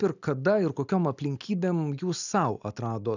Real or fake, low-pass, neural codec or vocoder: real; 7.2 kHz; none